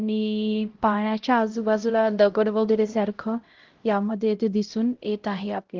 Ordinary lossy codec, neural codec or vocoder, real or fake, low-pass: Opus, 16 kbps; codec, 16 kHz, 0.5 kbps, X-Codec, HuBERT features, trained on LibriSpeech; fake; 7.2 kHz